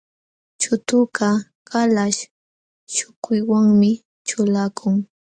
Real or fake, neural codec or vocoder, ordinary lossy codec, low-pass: real; none; Opus, 64 kbps; 9.9 kHz